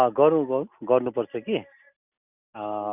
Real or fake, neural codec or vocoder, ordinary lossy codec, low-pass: real; none; none; 3.6 kHz